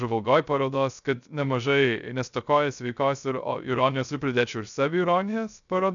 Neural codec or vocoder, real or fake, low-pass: codec, 16 kHz, 0.3 kbps, FocalCodec; fake; 7.2 kHz